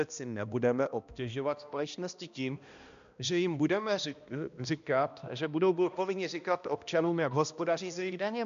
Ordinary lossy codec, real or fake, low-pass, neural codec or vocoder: MP3, 64 kbps; fake; 7.2 kHz; codec, 16 kHz, 1 kbps, X-Codec, HuBERT features, trained on balanced general audio